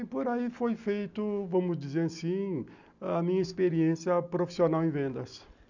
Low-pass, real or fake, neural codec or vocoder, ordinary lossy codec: 7.2 kHz; real; none; none